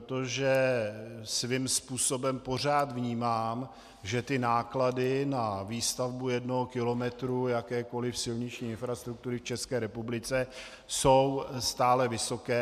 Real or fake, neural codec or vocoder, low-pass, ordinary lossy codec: real; none; 14.4 kHz; MP3, 96 kbps